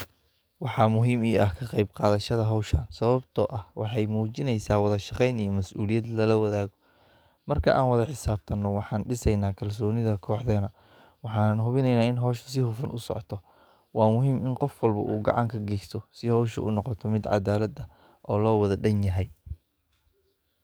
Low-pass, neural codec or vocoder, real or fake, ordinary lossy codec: none; codec, 44.1 kHz, 7.8 kbps, DAC; fake; none